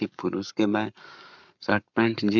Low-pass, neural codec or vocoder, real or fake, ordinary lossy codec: 7.2 kHz; codec, 44.1 kHz, 7.8 kbps, Pupu-Codec; fake; none